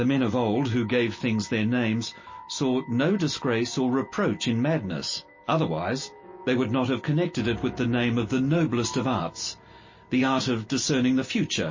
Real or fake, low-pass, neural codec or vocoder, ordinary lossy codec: real; 7.2 kHz; none; MP3, 32 kbps